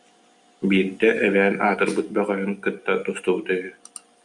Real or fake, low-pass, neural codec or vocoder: real; 10.8 kHz; none